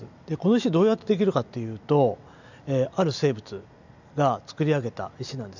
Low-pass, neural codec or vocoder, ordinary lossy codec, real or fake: 7.2 kHz; none; none; real